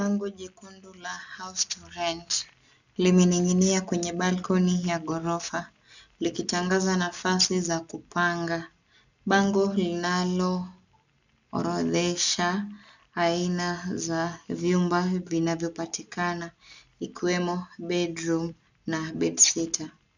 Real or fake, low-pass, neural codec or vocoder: real; 7.2 kHz; none